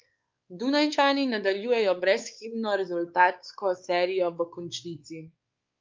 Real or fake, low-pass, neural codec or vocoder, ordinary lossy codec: fake; 7.2 kHz; codec, 16 kHz, 4 kbps, X-Codec, WavLM features, trained on Multilingual LibriSpeech; Opus, 24 kbps